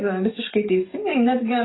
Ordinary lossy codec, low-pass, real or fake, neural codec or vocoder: AAC, 16 kbps; 7.2 kHz; fake; codec, 44.1 kHz, 7.8 kbps, DAC